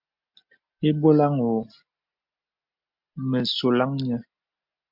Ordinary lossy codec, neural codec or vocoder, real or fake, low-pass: MP3, 48 kbps; none; real; 5.4 kHz